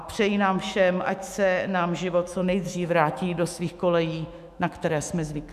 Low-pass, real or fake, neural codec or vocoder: 14.4 kHz; fake; autoencoder, 48 kHz, 128 numbers a frame, DAC-VAE, trained on Japanese speech